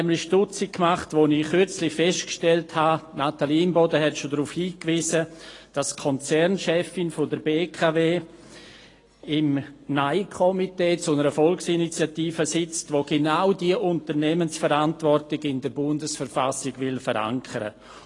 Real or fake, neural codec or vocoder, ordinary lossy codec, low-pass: real; none; AAC, 32 kbps; 10.8 kHz